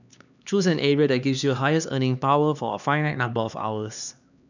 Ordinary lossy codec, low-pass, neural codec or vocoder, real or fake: none; 7.2 kHz; codec, 16 kHz, 2 kbps, X-Codec, HuBERT features, trained on LibriSpeech; fake